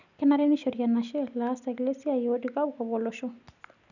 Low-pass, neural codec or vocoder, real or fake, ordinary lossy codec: 7.2 kHz; none; real; none